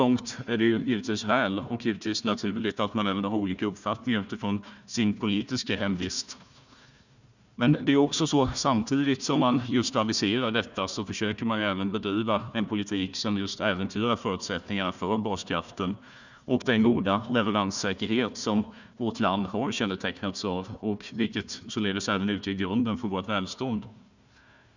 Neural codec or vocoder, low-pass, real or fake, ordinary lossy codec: codec, 16 kHz, 1 kbps, FunCodec, trained on Chinese and English, 50 frames a second; 7.2 kHz; fake; none